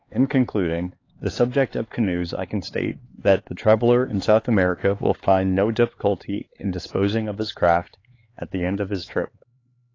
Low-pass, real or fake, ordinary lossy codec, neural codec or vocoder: 7.2 kHz; fake; AAC, 32 kbps; codec, 16 kHz, 4 kbps, X-Codec, HuBERT features, trained on LibriSpeech